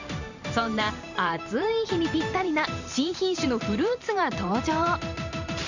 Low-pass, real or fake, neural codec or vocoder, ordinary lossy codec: 7.2 kHz; fake; vocoder, 44.1 kHz, 128 mel bands every 512 samples, BigVGAN v2; none